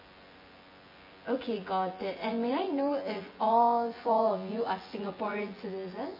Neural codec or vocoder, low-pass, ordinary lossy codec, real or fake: vocoder, 24 kHz, 100 mel bands, Vocos; 5.4 kHz; MP3, 24 kbps; fake